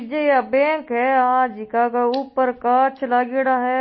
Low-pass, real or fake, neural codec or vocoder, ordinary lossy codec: 7.2 kHz; real; none; MP3, 24 kbps